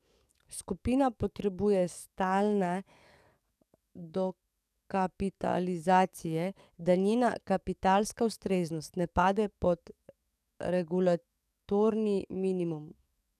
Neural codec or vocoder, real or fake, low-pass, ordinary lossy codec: codec, 44.1 kHz, 7.8 kbps, DAC; fake; 14.4 kHz; none